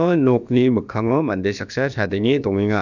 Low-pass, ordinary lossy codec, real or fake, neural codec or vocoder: 7.2 kHz; none; fake; codec, 16 kHz, about 1 kbps, DyCAST, with the encoder's durations